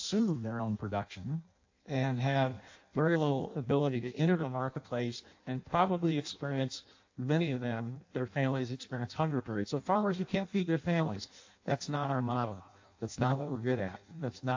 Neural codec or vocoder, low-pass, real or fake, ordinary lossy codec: codec, 16 kHz in and 24 kHz out, 0.6 kbps, FireRedTTS-2 codec; 7.2 kHz; fake; AAC, 48 kbps